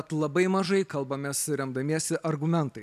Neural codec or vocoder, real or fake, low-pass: none; real; 14.4 kHz